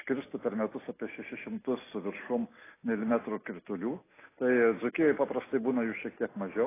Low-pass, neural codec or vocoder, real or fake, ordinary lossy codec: 3.6 kHz; none; real; AAC, 16 kbps